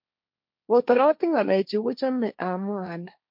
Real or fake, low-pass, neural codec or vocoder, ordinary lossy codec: fake; 5.4 kHz; codec, 16 kHz, 1.1 kbps, Voila-Tokenizer; MP3, 48 kbps